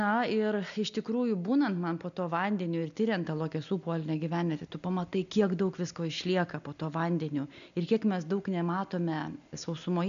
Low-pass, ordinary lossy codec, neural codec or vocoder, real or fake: 7.2 kHz; MP3, 96 kbps; none; real